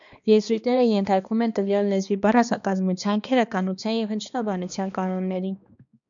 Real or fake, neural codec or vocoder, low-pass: fake; codec, 16 kHz, 2 kbps, X-Codec, HuBERT features, trained on balanced general audio; 7.2 kHz